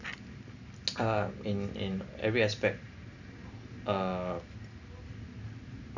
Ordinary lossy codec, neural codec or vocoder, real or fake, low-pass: none; none; real; 7.2 kHz